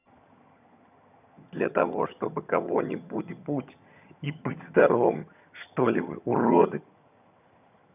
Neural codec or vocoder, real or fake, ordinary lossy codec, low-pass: vocoder, 22.05 kHz, 80 mel bands, HiFi-GAN; fake; none; 3.6 kHz